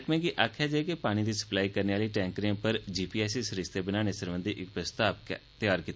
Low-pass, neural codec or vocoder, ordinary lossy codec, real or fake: none; none; none; real